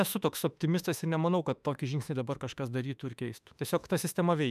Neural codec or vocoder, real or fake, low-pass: autoencoder, 48 kHz, 32 numbers a frame, DAC-VAE, trained on Japanese speech; fake; 14.4 kHz